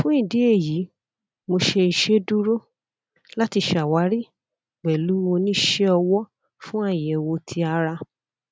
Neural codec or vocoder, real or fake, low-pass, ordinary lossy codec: none; real; none; none